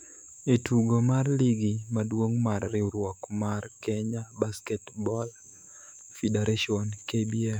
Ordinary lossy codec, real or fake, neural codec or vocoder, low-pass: none; fake; autoencoder, 48 kHz, 128 numbers a frame, DAC-VAE, trained on Japanese speech; 19.8 kHz